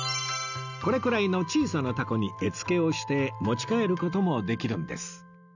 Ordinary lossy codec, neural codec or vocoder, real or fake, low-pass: none; none; real; 7.2 kHz